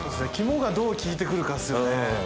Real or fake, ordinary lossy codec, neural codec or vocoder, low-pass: real; none; none; none